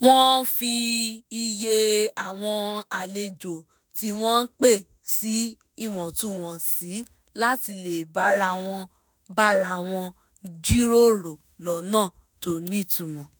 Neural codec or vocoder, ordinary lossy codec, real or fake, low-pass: autoencoder, 48 kHz, 32 numbers a frame, DAC-VAE, trained on Japanese speech; none; fake; none